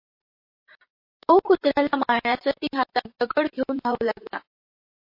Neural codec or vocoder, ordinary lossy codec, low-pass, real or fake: vocoder, 44.1 kHz, 128 mel bands, Pupu-Vocoder; MP3, 32 kbps; 5.4 kHz; fake